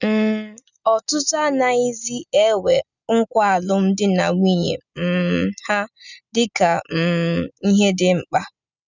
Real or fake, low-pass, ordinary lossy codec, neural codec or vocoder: real; 7.2 kHz; none; none